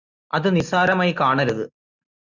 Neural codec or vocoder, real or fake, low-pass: none; real; 7.2 kHz